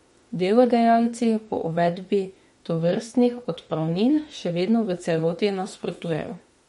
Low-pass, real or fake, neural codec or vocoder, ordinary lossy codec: 19.8 kHz; fake; autoencoder, 48 kHz, 32 numbers a frame, DAC-VAE, trained on Japanese speech; MP3, 48 kbps